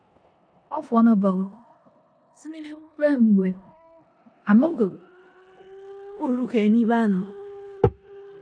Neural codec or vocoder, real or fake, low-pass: codec, 16 kHz in and 24 kHz out, 0.9 kbps, LongCat-Audio-Codec, four codebook decoder; fake; 9.9 kHz